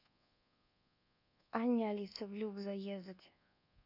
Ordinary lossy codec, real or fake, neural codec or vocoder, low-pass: none; fake; codec, 24 kHz, 1.2 kbps, DualCodec; 5.4 kHz